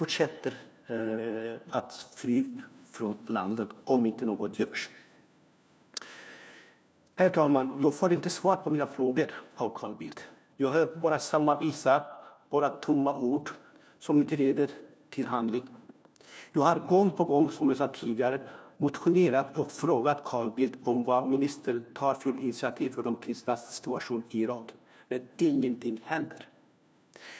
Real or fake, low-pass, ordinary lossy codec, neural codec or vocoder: fake; none; none; codec, 16 kHz, 1 kbps, FunCodec, trained on LibriTTS, 50 frames a second